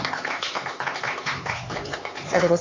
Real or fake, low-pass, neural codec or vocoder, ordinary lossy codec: fake; 7.2 kHz; codec, 16 kHz, 4 kbps, X-Codec, HuBERT features, trained on LibriSpeech; AAC, 32 kbps